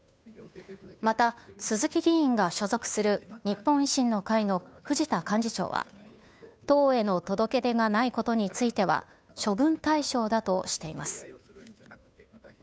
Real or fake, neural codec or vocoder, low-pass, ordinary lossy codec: fake; codec, 16 kHz, 2 kbps, FunCodec, trained on Chinese and English, 25 frames a second; none; none